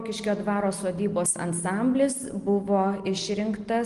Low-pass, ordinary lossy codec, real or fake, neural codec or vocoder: 10.8 kHz; Opus, 24 kbps; real; none